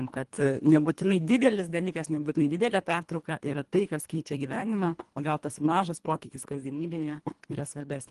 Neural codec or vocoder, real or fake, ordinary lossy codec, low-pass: codec, 24 kHz, 1.5 kbps, HILCodec; fake; Opus, 24 kbps; 10.8 kHz